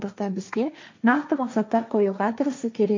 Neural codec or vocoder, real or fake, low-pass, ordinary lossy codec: codec, 16 kHz, 1.1 kbps, Voila-Tokenizer; fake; 7.2 kHz; MP3, 48 kbps